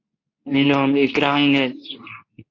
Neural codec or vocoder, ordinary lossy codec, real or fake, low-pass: codec, 24 kHz, 0.9 kbps, WavTokenizer, medium speech release version 2; AAC, 48 kbps; fake; 7.2 kHz